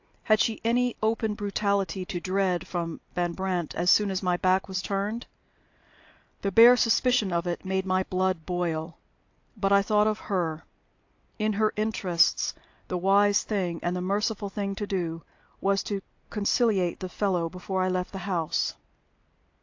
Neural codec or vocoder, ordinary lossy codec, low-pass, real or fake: none; AAC, 48 kbps; 7.2 kHz; real